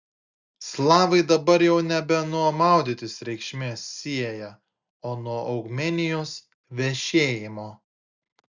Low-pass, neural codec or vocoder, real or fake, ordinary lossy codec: 7.2 kHz; none; real; Opus, 64 kbps